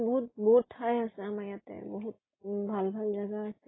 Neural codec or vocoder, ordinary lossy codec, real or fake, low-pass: none; AAC, 16 kbps; real; 7.2 kHz